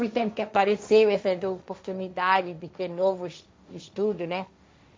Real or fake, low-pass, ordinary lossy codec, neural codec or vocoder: fake; none; none; codec, 16 kHz, 1.1 kbps, Voila-Tokenizer